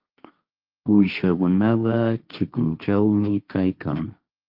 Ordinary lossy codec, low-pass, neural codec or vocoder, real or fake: Opus, 24 kbps; 5.4 kHz; codec, 16 kHz, 1.1 kbps, Voila-Tokenizer; fake